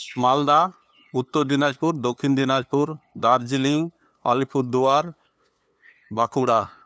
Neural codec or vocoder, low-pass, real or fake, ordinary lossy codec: codec, 16 kHz, 2 kbps, FunCodec, trained on LibriTTS, 25 frames a second; none; fake; none